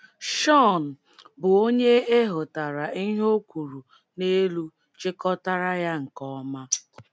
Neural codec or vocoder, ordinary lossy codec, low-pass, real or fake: none; none; none; real